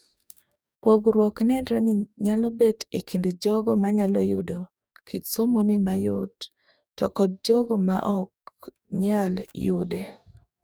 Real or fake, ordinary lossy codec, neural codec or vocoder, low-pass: fake; none; codec, 44.1 kHz, 2.6 kbps, DAC; none